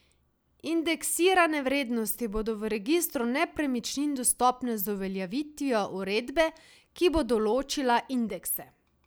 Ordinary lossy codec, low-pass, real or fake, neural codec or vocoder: none; none; real; none